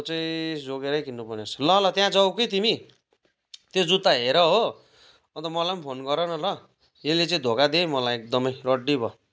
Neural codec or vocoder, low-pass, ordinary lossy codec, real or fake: none; none; none; real